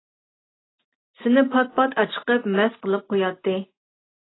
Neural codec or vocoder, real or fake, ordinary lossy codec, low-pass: none; real; AAC, 16 kbps; 7.2 kHz